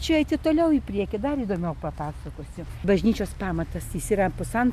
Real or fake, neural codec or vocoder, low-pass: real; none; 14.4 kHz